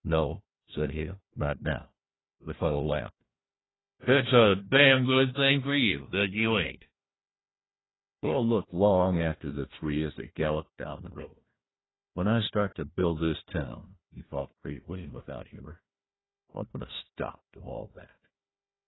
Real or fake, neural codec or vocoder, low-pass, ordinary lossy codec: fake; codec, 16 kHz, 1 kbps, FunCodec, trained on Chinese and English, 50 frames a second; 7.2 kHz; AAC, 16 kbps